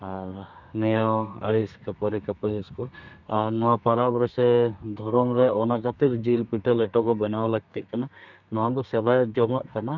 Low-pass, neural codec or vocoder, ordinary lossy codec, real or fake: 7.2 kHz; codec, 32 kHz, 1.9 kbps, SNAC; none; fake